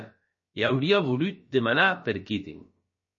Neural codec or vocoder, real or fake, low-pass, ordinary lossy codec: codec, 16 kHz, about 1 kbps, DyCAST, with the encoder's durations; fake; 7.2 kHz; MP3, 32 kbps